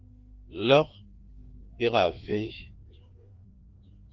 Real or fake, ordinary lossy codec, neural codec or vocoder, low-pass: fake; Opus, 16 kbps; codec, 16 kHz, 2 kbps, FreqCodec, larger model; 7.2 kHz